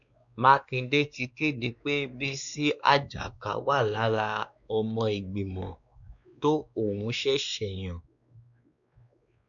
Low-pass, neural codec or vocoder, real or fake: 7.2 kHz; codec, 16 kHz, 2 kbps, X-Codec, WavLM features, trained on Multilingual LibriSpeech; fake